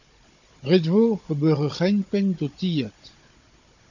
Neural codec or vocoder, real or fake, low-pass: codec, 16 kHz, 16 kbps, FunCodec, trained on Chinese and English, 50 frames a second; fake; 7.2 kHz